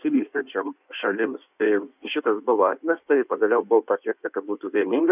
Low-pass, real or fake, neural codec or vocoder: 3.6 kHz; fake; codec, 16 kHz in and 24 kHz out, 1.1 kbps, FireRedTTS-2 codec